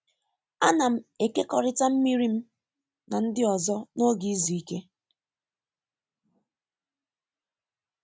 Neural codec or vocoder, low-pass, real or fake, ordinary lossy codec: none; none; real; none